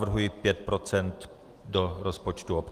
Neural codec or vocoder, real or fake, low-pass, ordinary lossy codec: none; real; 14.4 kHz; Opus, 24 kbps